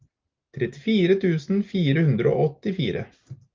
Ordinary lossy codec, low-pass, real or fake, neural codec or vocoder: Opus, 24 kbps; 7.2 kHz; real; none